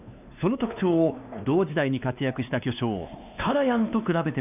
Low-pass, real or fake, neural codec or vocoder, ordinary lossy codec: 3.6 kHz; fake; codec, 16 kHz, 2 kbps, X-Codec, WavLM features, trained on Multilingual LibriSpeech; none